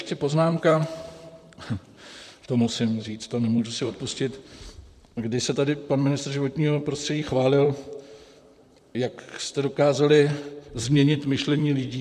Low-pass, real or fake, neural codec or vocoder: 14.4 kHz; fake; vocoder, 44.1 kHz, 128 mel bands, Pupu-Vocoder